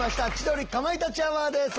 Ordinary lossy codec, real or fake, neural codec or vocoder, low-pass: Opus, 16 kbps; real; none; 7.2 kHz